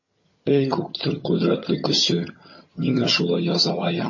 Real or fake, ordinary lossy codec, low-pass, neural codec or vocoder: fake; MP3, 32 kbps; 7.2 kHz; vocoder, 22.05 kHz, 80 mel bands, HiFi-GAN